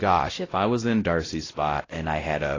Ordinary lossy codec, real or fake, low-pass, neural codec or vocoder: AAC, 32 kbps; fake; 7.2 kHz; codec, 16 kHz, 0.5 kbps, X-Codec, WavLM features, trained on Multilingual LibriSpeech